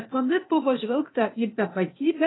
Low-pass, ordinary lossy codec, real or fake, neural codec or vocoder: 7.2 kHz; AAC, 16 kbps; fake; codec, 16 kHz, 0.8 kbps, ZipCodec